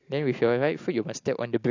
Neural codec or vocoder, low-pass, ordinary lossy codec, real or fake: none; 7.2 kHz; AAC, 48 kbps; real